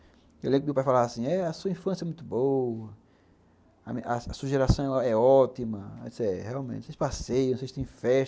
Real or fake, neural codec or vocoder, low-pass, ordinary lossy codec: real; none; none; none